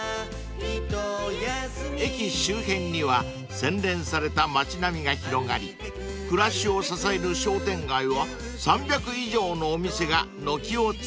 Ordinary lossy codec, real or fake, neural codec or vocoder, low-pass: none; real; none; none